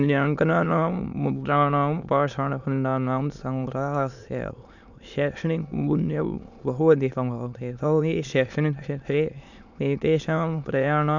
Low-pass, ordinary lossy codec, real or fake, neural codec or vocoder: 7.2 kHz; none; fake; autoencoder, 22.05 kHz, a latent of 192 numbers a frame, VITS, trained on many speakers